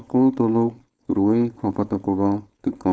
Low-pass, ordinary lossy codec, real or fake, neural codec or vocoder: none; none; fake; codec, 16 kHz, 4.8 kbps, FACodec